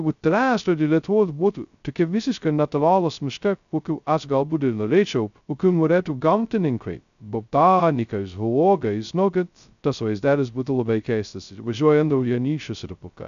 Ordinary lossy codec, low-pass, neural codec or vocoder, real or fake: AAC, 96 kbps; 7.2 kHz; codec, 16 kHz, 0.2 kbps, FocalCodec; fake